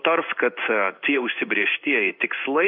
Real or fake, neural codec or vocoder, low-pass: fake; codec, 16 kHz in and 24 kHz out, 1 kbps, XY-Tokenizer; 5.4 kHz